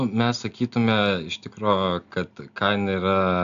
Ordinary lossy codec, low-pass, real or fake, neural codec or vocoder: AAC, 96 kbps; 7.2 kHz; real; none